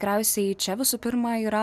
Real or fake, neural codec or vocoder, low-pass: real; none; 14.4 kHz